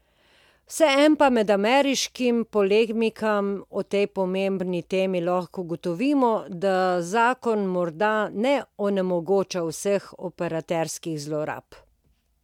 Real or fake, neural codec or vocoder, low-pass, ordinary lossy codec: real; none; 19.8 kHz; MP3, 96 kbps